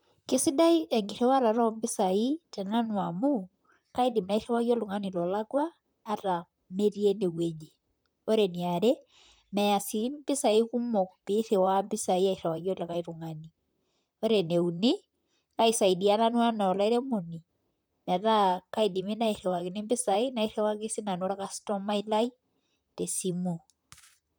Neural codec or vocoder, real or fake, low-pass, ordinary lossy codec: vocoder, 44.1 kHz, 128 mel bands, Pupu-Vocoder; fake; none; none